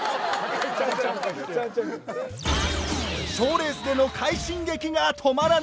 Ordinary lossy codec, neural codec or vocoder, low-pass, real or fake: none; none; none; real